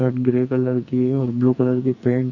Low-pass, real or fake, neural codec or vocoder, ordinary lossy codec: 7.2 kHz; fake; codec, 44.1 kHz, 2.6 kbps, SNAC; none